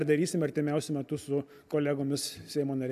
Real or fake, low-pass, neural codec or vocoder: real; 14.4 kHz; none